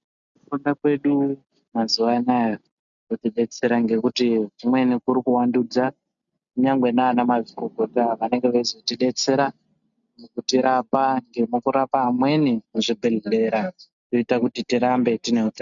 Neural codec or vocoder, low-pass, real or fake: none; 7.2 kHz; real